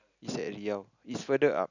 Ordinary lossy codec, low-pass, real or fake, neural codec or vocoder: none; 7.2 kHz; real; none